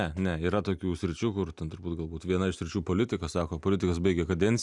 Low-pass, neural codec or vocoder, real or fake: 10.8 kHz; none; real